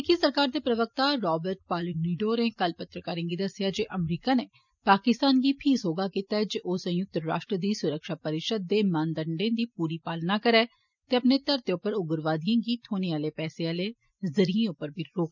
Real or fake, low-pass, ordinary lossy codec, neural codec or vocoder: real; 7.2 kHz; none; none